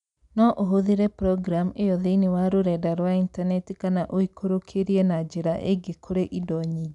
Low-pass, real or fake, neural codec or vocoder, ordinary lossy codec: 10.8 kHz; real; none; none